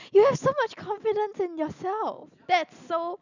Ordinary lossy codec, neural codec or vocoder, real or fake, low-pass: none; none; real; 7.2 kHz